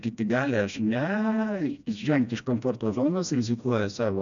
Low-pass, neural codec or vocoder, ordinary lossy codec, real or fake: 7.2 kHz; codec, 16 kHz, 1 kbps, FreqCodec, smaller model; MP3, 96 kbps; fake